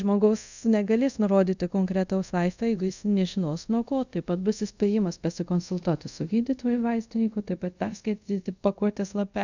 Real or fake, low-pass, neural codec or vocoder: fake; 7.2 kHz; codec, 24 kHz, 0.5 kbps, DualCodec